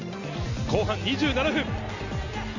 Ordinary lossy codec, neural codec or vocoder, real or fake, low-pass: none; none; real; 7.2 kHz